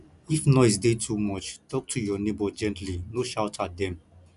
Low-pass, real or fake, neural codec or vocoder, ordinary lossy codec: 10.8 kHz; real; none; none